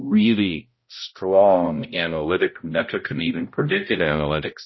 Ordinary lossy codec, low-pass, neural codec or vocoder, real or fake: MP3, 24 kbps; 7.2 kHz; codec, 16 kHz, 0.5 kbps, X-Codec, HuBERT features, trained on balanced general audio; fake